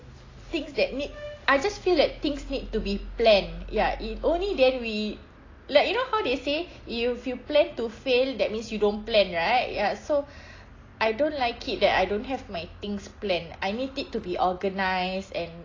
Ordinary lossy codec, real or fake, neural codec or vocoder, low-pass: AAC, 32 kbps; real; none; 7.2 kHz